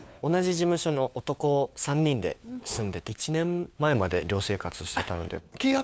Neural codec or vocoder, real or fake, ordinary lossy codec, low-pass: codec, 16 kHz, 4 kbps, FunCodec, trained on LibriTTS, 50 frames a second; fake; none; none